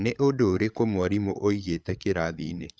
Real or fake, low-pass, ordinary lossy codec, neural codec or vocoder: fake; none; none; codec, 16 kHz, 8 kbps, FreqCodec, larger model